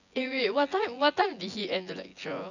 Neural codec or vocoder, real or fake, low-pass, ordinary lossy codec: vocoder, 24 kHz, 100 mel bands, Vocos; fake; 7.2 kHz; none